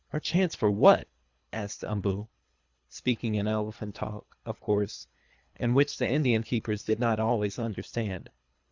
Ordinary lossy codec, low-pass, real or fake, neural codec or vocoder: Opus, 64 kbps; 7.2 kHz; fake; codec, 24 kHz, 3 kbps, HILCodec